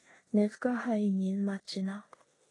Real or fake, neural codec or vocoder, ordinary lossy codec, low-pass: fake; codec, 16 kHz in and 24 kHz out, 0.9 kbps, LongCat-Audio-Codec, four codebook decoder; AAC, 32 kbps; 10.8 kHz